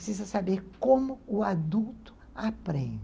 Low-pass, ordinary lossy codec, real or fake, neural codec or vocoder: none; none; real; none